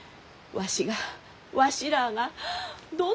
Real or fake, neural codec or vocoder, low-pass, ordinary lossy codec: real; none; none; none